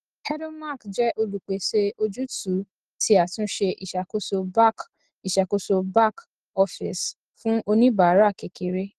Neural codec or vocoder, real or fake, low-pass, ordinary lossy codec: none; real; 10.8 kHz; Opus, 16 kbps